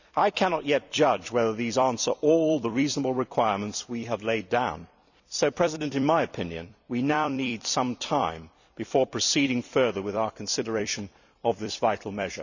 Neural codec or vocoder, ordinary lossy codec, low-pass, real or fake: vocoder, 44.1 kHz, 128 mel bands every 256 samples, BigVGAN v2; none; 7.2 kHz; fake